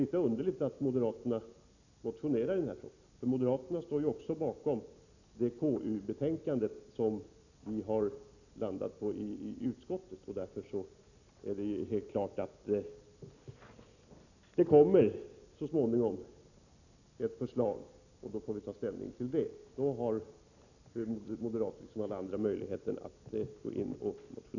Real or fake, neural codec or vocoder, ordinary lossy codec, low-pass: real; none; none; 7.2 kHz